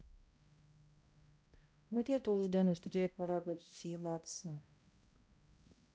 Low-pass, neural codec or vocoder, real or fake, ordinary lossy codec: none; codec, 16 kHz, 0.5 kbps, X-Codec, HuBERT features, trained on balanced general audio; fake; none